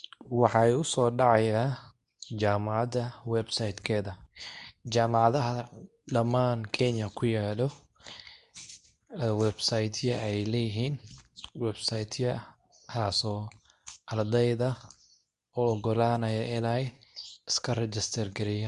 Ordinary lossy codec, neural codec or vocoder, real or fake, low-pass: none; codec, 24 kHz, 0.9 kbps, WavTokenizer, medium speech release version 2; fake; 10.8 kHz